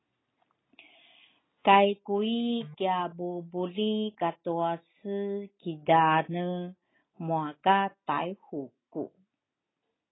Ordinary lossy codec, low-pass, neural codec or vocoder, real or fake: AAC, 16 kbps; 7.2 kHz; none; real